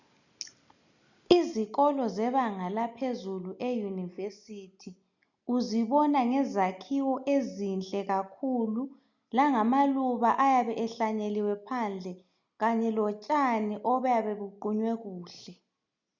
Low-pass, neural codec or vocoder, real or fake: 7.2 kHz; none; real